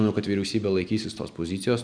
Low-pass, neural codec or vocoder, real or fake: 9.9 kHz; none; real